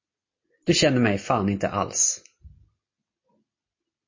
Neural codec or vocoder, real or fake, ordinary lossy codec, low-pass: none; real; MP3, 32 kbps; 7.2 kHz